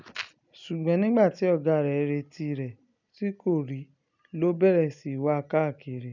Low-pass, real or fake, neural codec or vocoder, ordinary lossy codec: 7.2 kHz; real; none; none